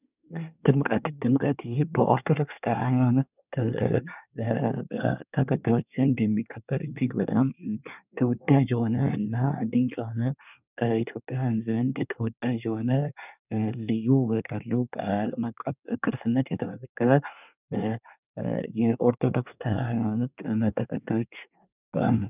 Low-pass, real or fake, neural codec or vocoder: 3.6 kHz; fake; codec, 24 kHz, 1 kbps, SNAC